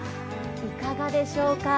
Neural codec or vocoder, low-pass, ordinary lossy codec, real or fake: none; none; none; real